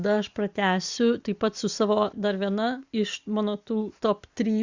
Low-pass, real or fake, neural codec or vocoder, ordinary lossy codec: 7.2 kHz; real; none; Opus, 64 kbps